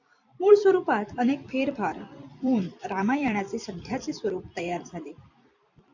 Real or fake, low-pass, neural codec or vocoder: fake; 7.2 kHz; vocoder, 44.1 kHz, 128 mel bands every 256 samples, BigVGAN v2